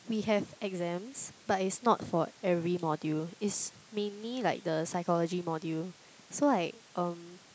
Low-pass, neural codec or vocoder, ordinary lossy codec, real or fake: none; none; none; real